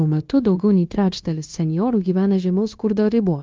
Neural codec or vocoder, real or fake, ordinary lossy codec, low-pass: codec, 16 kHz, 0.9 kbps, LongCat-Audio-Codec; fake; Opus, 32 kbps; 7.2 kHz